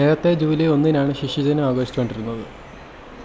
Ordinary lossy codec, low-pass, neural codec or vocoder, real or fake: none; none; none; real